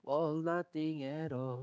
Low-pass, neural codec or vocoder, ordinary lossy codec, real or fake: none; codec, 16 kHz, 4 kbps, X-Codec, HuBERT features, trained on general audio; none; fake